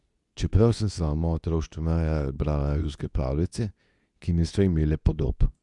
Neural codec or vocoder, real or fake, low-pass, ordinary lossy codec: codec, 24 kHz, 0.9 kbps, WavTokenizer, medium speech release version 2; fake; 10.8 kHz; none